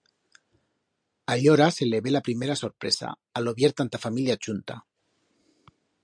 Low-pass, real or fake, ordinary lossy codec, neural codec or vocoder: 9.9 kHz; real; MP3, 64 kbps; none